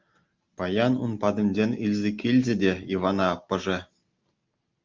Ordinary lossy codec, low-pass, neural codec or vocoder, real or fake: Opus, 24 kbps; 7.2 kHz; none; real